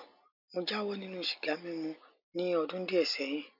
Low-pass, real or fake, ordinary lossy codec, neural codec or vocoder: 5.4 kHz; real; none; none